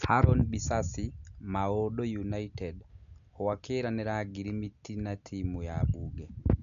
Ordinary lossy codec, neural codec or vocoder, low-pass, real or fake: none; none; 7.2 kHz; real